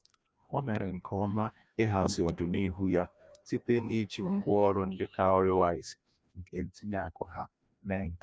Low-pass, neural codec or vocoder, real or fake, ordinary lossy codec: none; codec, 16 kHz, 1 kbps, FreqCodec, larger model; fake; none